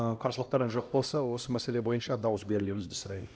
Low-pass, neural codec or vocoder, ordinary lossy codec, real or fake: none; codec, 16 kHz, 1 kbps, X-Codec, HuBERT features, trained on LibriSpeech; none; fake